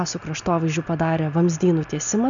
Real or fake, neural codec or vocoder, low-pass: real; none; 7.2 kHz